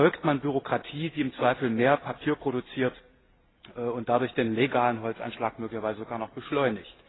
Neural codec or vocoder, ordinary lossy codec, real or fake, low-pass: none; AAC, 16 kbps; real; 7.2 kHz